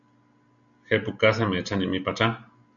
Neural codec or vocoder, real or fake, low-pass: none; real; 7.2 kHz